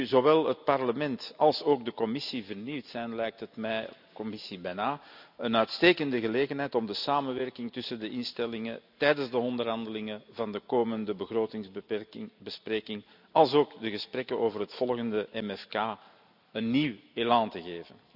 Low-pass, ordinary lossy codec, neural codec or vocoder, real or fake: 5.4 kHz; none; none; real